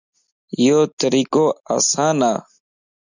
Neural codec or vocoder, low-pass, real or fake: none; 7.2 kHz; real